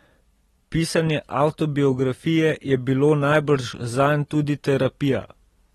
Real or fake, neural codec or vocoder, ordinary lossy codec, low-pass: real; none; AAC, 32 kbps; 19.8 kHz